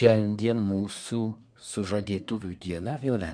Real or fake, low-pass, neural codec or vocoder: fake; 9.9 kHz; codec, 24 kHz, 1 kbps, SNAC